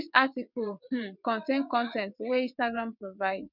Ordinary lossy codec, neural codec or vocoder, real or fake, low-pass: none; vocoder, 22.05 kHz, 80 mel bands, WaveNeXt; fake; 5.4 kHz